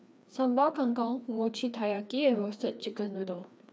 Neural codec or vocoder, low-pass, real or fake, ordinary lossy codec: codec, 16 kHz, 2 kbps, FreqCodec, larger model; none; fake; none